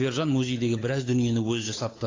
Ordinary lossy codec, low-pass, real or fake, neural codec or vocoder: AAC, 32 kbps; 7.2 kHz; fake; codec, 16 kHz, 8 kbps, FunCodec, trained on Chinese and English, 25 frames a second